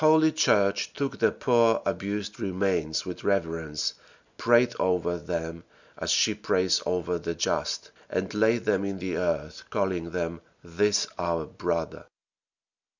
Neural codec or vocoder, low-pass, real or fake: none; 7.2 kHz; real